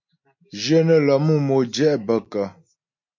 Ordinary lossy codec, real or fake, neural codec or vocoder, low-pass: MP3, 48 kbps; real; none; 7.2 kHz